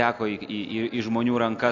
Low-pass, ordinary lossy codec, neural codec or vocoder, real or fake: 7.2 kHz; AAC, 48 kbps; none; real